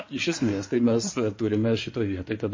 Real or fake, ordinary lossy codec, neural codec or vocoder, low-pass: fake; MP3, 32 kbps; codec, 16 kHz in and 24 kHz out, 2.2 kbps, FireRedTTS-2 codec; 7.2 kHz